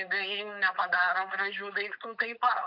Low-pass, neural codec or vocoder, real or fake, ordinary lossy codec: 5.4 kHz; codec, 16 kHz, 4.8 kbps, FACodec; fake; MP3, 48 kbps